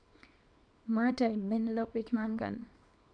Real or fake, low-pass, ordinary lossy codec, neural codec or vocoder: fake; 9.9 kHz; none; codec, 24 kHz, 0.9 kbps, WavTokenizer, small release